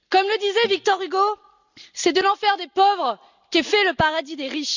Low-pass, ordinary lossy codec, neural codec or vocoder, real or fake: 7.2 kHz; none; none; real